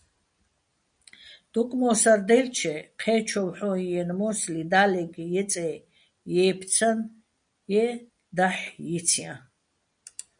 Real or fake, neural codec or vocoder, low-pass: real; none; 9.9 kHz